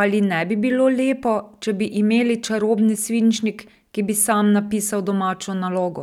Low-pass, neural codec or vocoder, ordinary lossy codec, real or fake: 19.8 kHz; none; none; real